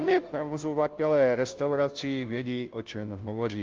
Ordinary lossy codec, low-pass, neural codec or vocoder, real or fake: Opus, 32 kbps; 7.2 kHz; codec, 16 kHz, 0.5 kbps, FunCodec, trained on Chinese and English, 25 frames a second; fake